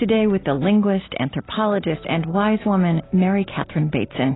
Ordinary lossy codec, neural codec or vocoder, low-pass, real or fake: AAC, 16 kbps; none; 7.2 kHz; real